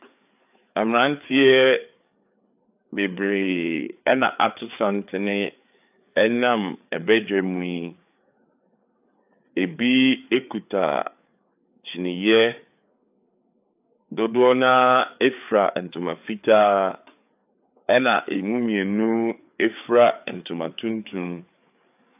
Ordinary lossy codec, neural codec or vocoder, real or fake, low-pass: AAC, 32 kbps; codec, 16 kHz, 4 kbps, FreqCodec, larger model; fake; 3.6 kHz